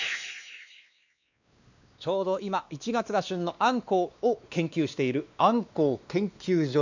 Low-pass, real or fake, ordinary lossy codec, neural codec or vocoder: 7.2 kHz; fake; none; codec, 16 kHz, 2 kbps, X-Codec, WavLM features, trained on Multilingual LibriSpeech